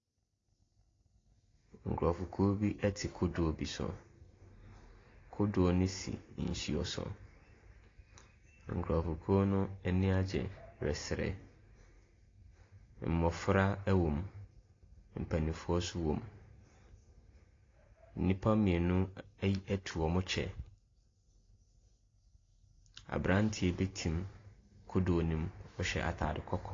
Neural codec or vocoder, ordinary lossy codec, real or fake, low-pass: none; AAC, 32 kbps; real; 7.2 kHz